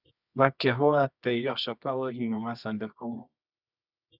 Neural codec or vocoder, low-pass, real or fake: codec, 24 kHz, 0.9 kbps, WavTokenizer, medium music audio release; 5.4 kHz; fake